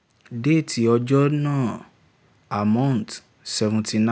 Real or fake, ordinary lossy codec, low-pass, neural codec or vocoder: real; none; none; none